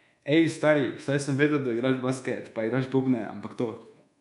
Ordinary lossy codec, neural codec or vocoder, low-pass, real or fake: none; codec, 24 kHz, 1.2 kbps, DualCodec; 10.8 kHz; fake